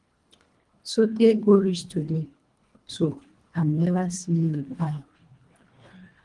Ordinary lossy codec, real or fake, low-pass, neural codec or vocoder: Opus, 24 kbps; fake; 10.8 kHz; codec, 24 kHz, 1.5 kbps, HILCodec